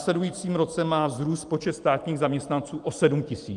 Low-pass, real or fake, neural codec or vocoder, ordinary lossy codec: 10.8 kHz; fake; vocoder, 44.1 kHz, 128 mel bands every 512 samples, BigVGAN v2; Opus, 32 kbps